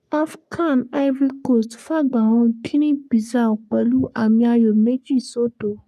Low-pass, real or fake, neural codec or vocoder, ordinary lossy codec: 14.4 kHz; fake; codec, 44.1 kHz, 3.4 kbps, Pupu-Codec; none